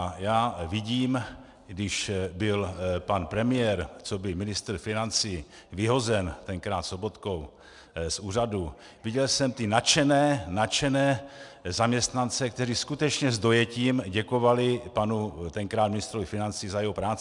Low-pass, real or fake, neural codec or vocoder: 10.8 kHz; real; none